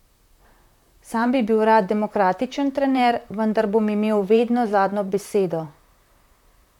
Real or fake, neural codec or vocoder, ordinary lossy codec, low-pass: fake; vocoder, 44.1 kHz, 128 mel bands, Pupu-Vocoder; none; 19.8 kHz